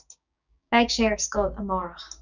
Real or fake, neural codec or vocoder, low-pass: fake; codec, 16 kHz, 6 kbps, DAC; 7.2 kHz